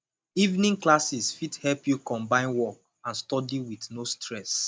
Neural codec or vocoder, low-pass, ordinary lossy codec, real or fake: none; none; none; real